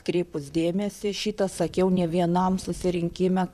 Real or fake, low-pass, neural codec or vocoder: fake; 14.4 kHz; vocoder, 44.1 kHz, 128 mel bands, Pupu-Vocoder